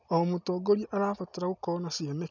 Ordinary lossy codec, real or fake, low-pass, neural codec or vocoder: none; real; 7.2 kHz; none